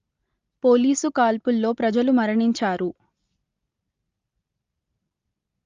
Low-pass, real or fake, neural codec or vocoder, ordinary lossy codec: 7.2 kHz; real; none; Opus, 32 kbps